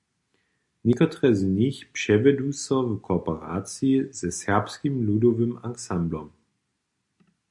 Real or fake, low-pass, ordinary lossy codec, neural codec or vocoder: real; 10.8 kHz; MP3, 64 kbps; none